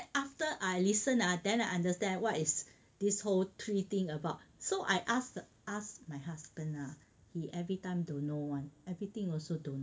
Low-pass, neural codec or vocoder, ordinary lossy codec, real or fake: none; none; none; real